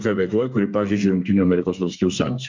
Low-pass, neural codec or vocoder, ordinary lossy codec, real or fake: 7.2 kHz; codec, 32 kHz, 1.9 kbps, SNAC; MP3, 64 kbps; fake